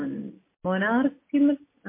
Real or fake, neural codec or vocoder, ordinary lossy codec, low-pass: real; none; MP3, 24 kbps; 3.6 kHz